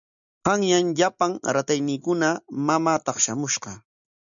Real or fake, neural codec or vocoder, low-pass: real; none; 7.2 kHz